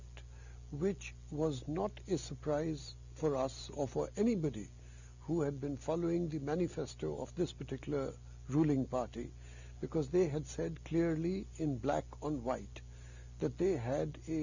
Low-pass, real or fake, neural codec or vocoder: 7.2 kHz; real; none